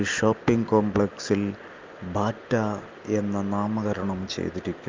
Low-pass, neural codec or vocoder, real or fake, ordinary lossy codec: 7.2 kHz; none; real; Opus, 24 kbps